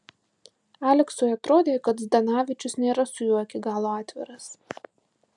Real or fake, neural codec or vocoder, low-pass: real; none; 10.8 kHz